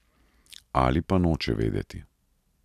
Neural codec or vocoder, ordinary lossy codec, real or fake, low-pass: none; none; real; 14.4 kHz